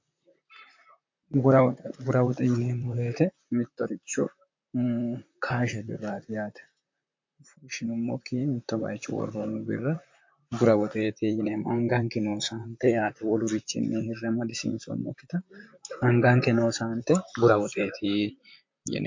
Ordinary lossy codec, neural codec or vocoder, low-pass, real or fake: MP3, 48 kbps; vocoder, 44.1 kHz, 128 mel bands, Pupu-Vocoder; 7.2 kHz; fake